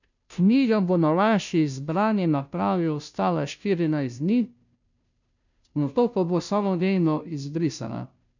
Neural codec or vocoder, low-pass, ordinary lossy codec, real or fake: codec, 16 kHz, 0.5 kbps, FunCodec, trained on Chinese and English, 25 frames a second; 7.2 kHz; none; fake